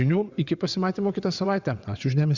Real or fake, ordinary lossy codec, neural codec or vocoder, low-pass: fake; Opus, 64 kbps; vocoder, 22.05 kHz, 80 mel bands, WaveNeXt; 7.2 kHz